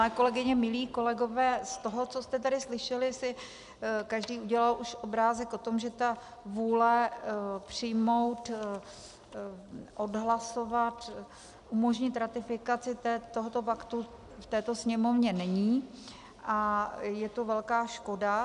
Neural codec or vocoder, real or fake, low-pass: none; real; 10.8 kHz